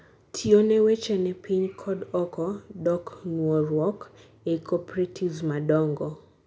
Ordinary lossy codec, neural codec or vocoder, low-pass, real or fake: none; none; none; real